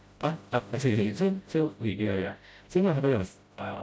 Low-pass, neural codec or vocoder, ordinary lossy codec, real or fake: none; codec, 16 kHz, 0.5 kbps, FreqCodec, smaller model; none; fake